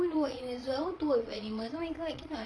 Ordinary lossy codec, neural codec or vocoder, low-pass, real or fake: none; vocoder, 22.05 kHz, 80 mel bands, Vocos; none; fake